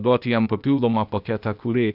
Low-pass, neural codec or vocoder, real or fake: 5.4 kHz; codec, 16 kHz, 0.8 kbps, ZipCodec; fake